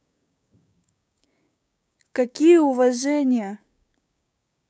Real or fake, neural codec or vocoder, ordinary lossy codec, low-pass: fake; codec, 16 kHz, 6 kbps, DAC; none; none